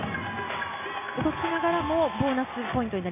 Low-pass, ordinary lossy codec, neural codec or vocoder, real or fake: 3.6 kHz; MP3, 32 kbps; none; real